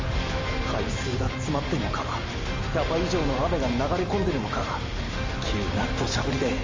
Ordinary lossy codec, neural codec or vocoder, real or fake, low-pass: Opus, 32 kbps; none; real; 7.2 kHz